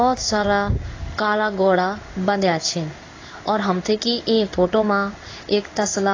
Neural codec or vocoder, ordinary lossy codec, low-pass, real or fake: codec, 16 kHz in and 24 kHz out, 1 kbps, XY-Tokenizer; AAC, 32 kbps; 7.2 kHz; fake